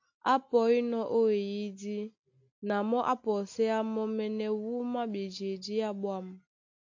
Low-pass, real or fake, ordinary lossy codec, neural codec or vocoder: 7.2 kHz; real; AAC, 48 kbps; none